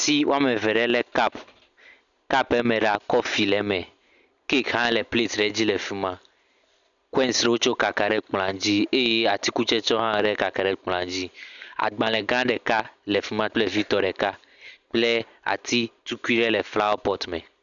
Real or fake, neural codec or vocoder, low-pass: real; none; 7.2 kHz